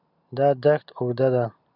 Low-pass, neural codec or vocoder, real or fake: 5.4 kHz; none; real